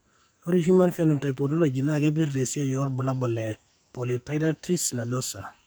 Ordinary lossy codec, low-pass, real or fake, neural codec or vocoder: none; none; fake; codec, 44.1 kHz, 2.6 kbps, SNAC